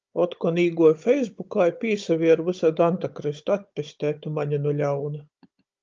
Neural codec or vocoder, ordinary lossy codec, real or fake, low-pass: codec, 16 kHz, 16 kbps, FunCodec, trained on Chinese and English, 50 frames a second; Opus, 24 kbps; fake; 7.2 kHz